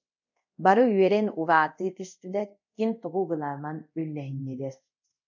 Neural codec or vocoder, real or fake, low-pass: codec, 24 kHz, 0.5 kbps, DualCodec; fake; 7.2 kHz